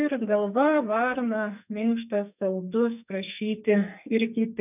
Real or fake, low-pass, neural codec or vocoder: fake; 3.6 kHz; codec, 44.1 kHz, 2.6 kbps, SNAC